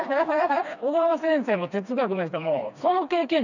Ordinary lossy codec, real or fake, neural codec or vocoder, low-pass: none; fake; codec, 16 kHz, 2 kbps, FreqCodec, smaller model; 7.2 kHz